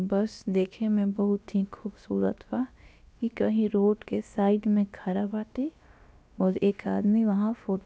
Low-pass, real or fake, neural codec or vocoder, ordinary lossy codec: none; fake; codec, 16 kHz, about 1 kbps, DyCAST, with the encoder's durations; none